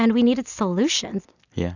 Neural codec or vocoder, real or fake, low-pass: none; real; 7.2 kHz